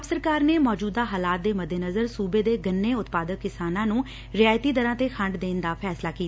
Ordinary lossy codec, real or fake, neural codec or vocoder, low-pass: none; real; none; none